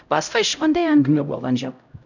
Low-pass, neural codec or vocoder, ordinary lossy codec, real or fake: 7.2 kHz; codec, 16 kHz, 0.5 kbps, X-Codec, HuBERT features, trained on LibriSpeech; none; fake